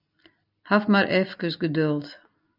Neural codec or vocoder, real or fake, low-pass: none; real; 5.4 kHz